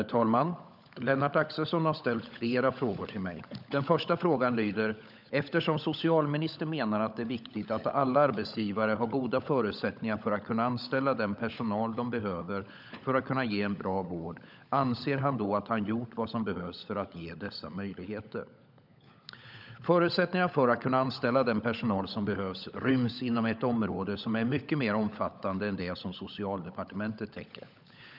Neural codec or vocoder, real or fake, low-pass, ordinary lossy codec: codec, 16 kHz, 16 kbps, FunCodec, trained on LibriTTS, 50 frames a second; fake; 5.4 kHz; none